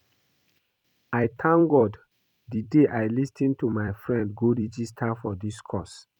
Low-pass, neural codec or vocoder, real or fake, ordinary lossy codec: 19.8 kHz; vocoder, 44.1 kHz, 128 mel bands every 256 samples, BigVGAN v2; fake; none